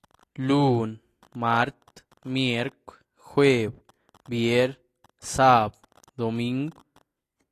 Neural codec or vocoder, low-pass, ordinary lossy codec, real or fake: none; 14.4 kHz; AAC, 48 kbps; real